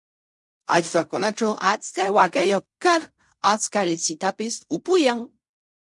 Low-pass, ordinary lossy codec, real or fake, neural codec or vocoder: 10.8 kHz; MP3, 64 kbps; fake; codec, 16 kHz in and 24 kHz out, 0.4 kbps, LongCat-Audio-Codec, fine tuned four codebook decoder